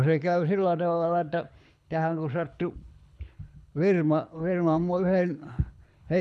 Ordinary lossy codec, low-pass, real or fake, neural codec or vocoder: none; none; fake; codec, 24 kHz, 6 kbps, HILCodec